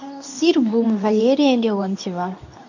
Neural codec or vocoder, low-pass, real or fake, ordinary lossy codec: codec, 24 kHz, 0.9 kbps, WavTokenizer, medium speech release version 2; 7.2 kHz; fake; none